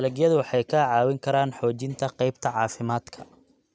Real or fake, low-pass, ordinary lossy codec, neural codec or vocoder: real; none; none; none